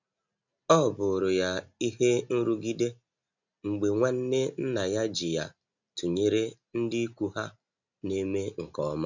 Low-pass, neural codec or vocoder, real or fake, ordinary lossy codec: 7.2 kHz; none; real; none